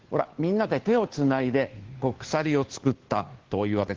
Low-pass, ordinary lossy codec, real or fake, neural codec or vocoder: 7.2 kHz; Opus, 16 kbps; fake; codec, 16 kHz, 2 kbps, FunCodec, trained on Chinese and English, 25 frames a second